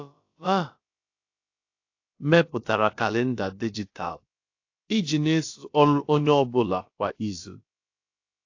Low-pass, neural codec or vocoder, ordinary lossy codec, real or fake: 7.2 kHz; codec, 16 kHz, about 1 kbps, DyCAST, with the encoder's durations; AAC, 48 kbps; fake